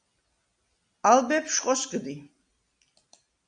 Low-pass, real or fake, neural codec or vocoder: 9.9 kHz; real; none